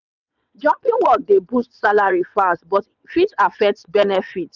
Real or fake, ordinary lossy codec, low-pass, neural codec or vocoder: fake; none; 7.2 kHz; vocoder, 22.05 kHz, 80 mel bands, WaveNeXt